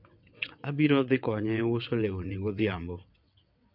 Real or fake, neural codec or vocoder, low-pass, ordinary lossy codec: fake; vocoder, 22.05 kHz, 80 mel bands, WaveNeXt; 5.4 kHz; MP3, 48 kbps